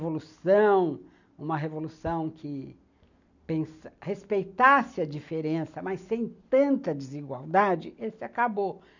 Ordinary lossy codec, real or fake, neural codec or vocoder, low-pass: none; real; none; 7.2 kHz